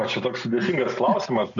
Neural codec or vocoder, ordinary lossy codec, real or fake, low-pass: none; MP3, 96 kbps; real; 7.2 kHz